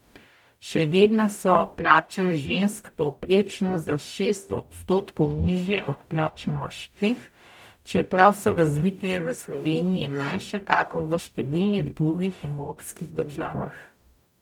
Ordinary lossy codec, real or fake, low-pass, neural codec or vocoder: none; fake; 19.8 kHz; codec, 44.1 kHz, 0.9 kbps, DAC